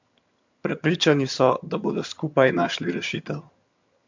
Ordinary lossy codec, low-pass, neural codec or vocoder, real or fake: MP3, 48 kbps; 7.2 kHz; vocoder, 22.05 kHz, 80 mel bands, HiFi-GAN; fake